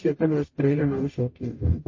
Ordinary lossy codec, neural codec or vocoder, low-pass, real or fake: MP3, 32 kbps; codec, 44.1 kHz, 0.9 kbps, DAC; 7.2 kHz; fake